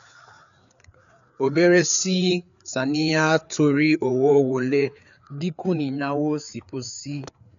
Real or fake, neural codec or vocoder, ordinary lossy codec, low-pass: fake; codec, 16 kHz, 4 kbps, FreqCodec, larger model; none; 7.2 kHz